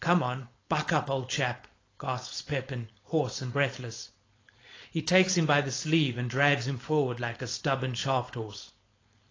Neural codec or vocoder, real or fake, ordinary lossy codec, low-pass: codec, 16 kHz, 4.8 kbps, FACodec; fake; AAC, 32 kbps; 7.2 kHz